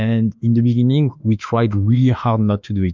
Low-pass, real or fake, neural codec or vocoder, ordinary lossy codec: 7.2 kHz; fake; autoencoder, 48 kHz, 32 numbers a frame, DAC-VAE, trained on Japanese speech; MP3, 64 kbps